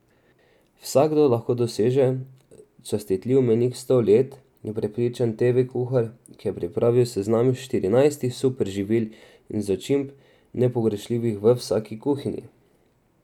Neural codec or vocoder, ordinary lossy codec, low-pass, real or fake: none; none; 19.8 kHz; real